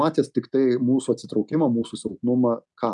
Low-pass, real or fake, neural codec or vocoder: 10.8 kHz; real; none